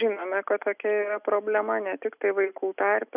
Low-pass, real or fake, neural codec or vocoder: 3.6 kHz; real; none